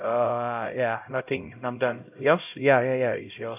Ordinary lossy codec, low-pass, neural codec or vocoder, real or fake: none; 3.6 kHz; codec, 16 kHz, 0.5 kbps, X-Codec, HuBERT features, trained on LibriSpeech; fake